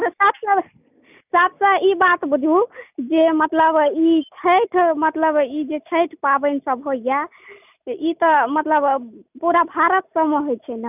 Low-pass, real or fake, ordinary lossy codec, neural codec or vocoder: 3.6 kHz; real; none; none